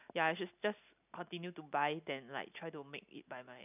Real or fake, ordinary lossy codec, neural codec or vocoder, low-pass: real; none; none; 3.6 kHz